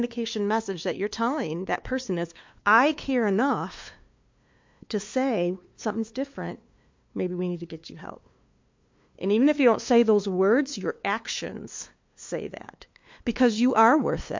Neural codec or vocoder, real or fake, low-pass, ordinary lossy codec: codec, 16 kHz, 2 kbps, FunCodec, trained on LibriTTS, 25 frames a second; fake; 7.2 kHz; MP3, 48 kbps